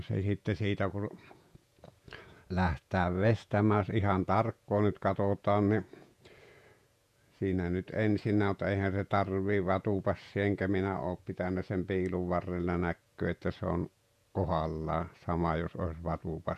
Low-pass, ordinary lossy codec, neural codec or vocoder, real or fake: 14.4 kHz; none; vocoder, 48 kHz, 128 mel bands, Vocos; fake